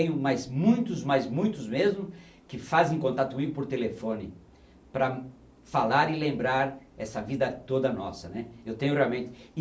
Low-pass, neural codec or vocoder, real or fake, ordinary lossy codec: none; none; real; none